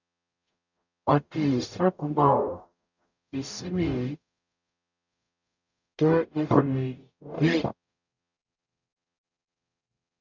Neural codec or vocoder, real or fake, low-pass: codec, 44.1 kHz, 0.9 kbps, DAC; fake; 7.2 kHz